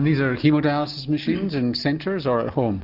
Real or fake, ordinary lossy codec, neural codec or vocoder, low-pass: real; Opus, 24 kbps; none; 5.4 kHz